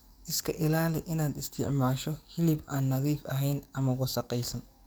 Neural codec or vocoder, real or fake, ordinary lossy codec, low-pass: codec, 44.1 kHz, 7.8 kbps, DAC; fake; none; none